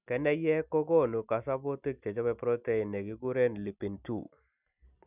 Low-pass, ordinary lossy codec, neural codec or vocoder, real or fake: 3.6 kHz; none; none; real